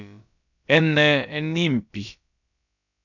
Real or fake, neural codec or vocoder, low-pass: fake; codec, 16 kHz, about 1 kbps, DyCAST, with the encoder's durations; 7.2 kHz